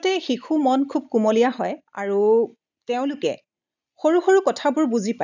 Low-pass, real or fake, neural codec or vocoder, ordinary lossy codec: 7.2 kHz; real; none; none